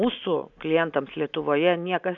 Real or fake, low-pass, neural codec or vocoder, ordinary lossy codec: real; 7.2 kHz; none; MP3, 64 kbps